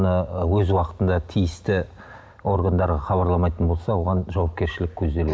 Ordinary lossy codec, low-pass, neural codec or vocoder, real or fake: none; none; none; real